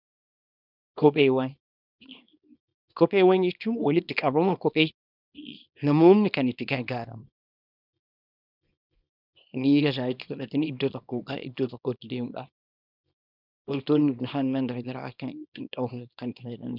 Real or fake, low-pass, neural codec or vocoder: fake; 5.4 kHz; codec, 24 kHz, 0.9 kbps, WavTokenizer, small release